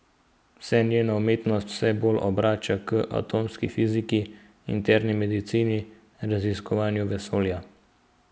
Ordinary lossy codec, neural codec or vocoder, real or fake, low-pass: none; none; real; none